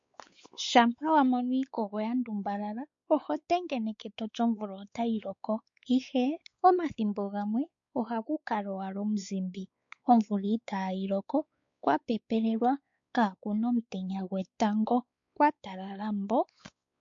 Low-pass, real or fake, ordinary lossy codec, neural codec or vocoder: 7.2 kHz; fake; MP3, 48 kbps; codec, 16 kHz, 4 kbps, X-Codec, WavLM features, trained on Multilingual LibriSpeech